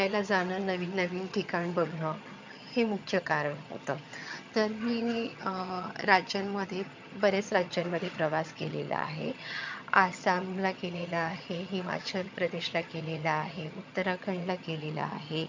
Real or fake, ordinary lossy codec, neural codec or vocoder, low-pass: fake; MP3, 64 kbps; vocoder, 22.05 kHz, 80 mel bands, HiFi-GAN; 7.2 kHz